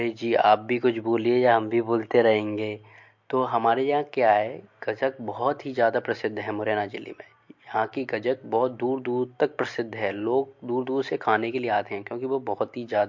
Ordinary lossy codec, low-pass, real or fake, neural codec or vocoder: MP3, 48 kbps; 7.2 kHz; real; none